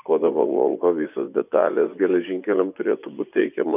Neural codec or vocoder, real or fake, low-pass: vocoder, 24 kHz, 100 mel bands, Vocos; fake; 3.6 kHz